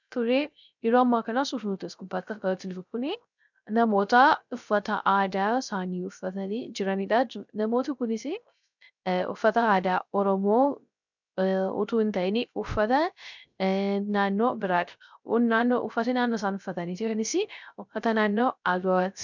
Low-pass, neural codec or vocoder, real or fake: 7.2 kHz; codec, 16 kHz, 0.3 kbps, FocalCodec; fake